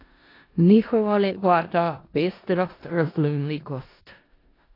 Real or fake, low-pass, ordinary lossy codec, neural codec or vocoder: fake; 5.4 kHz; AAC, 24 kbps; codec, 16 kHz in and 24 kHz out, 0.4 kbps, LongCat-Audio-Codec, four codebook decoder